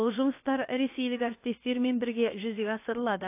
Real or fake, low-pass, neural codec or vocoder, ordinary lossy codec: fake; 3.6 kHz; codec, 16 kHz, about 1 kbps, DyCAST, with the encoder's durations; AAC, 24 kbps